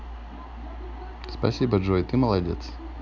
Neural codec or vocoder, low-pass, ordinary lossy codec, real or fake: none; 7.2 kHz; none; real